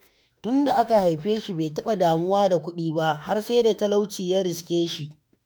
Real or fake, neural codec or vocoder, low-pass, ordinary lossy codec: fake; autoencoder, 48 kHz, 32 numbers a frame, DAC-VAE, trained on Japanese speech; none; none